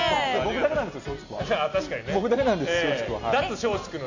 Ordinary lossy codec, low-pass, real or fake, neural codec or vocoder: none; 7.2 kHz; real; none